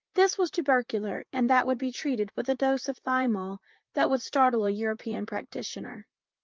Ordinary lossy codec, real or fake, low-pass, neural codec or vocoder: Opus, 32 kbps; fake; 7.2 kHz; vocoder, 44.1 kHz, 128 mel bands, Pupu-Vocoder